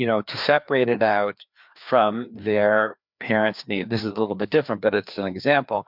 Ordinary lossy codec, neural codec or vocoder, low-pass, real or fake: MP3, 48 kbps; codec, 16 kHz, 2 kbps, FreqCodec, larger model; 5.4 kHz; fake